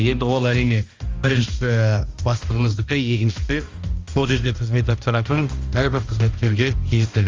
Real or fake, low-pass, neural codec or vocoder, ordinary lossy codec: fake; 7.2 kHz; codec, 16 kHz, 1 kbps, X-Codec, HuBERT features, trained on balanced general audio; Opus, 32 kbps